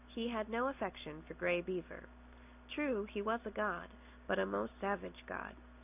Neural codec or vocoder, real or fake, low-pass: none; real; 3.6 kHz